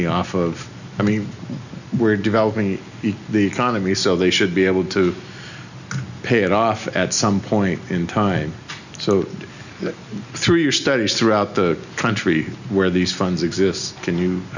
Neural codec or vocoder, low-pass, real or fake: none; 7.2 kHz; real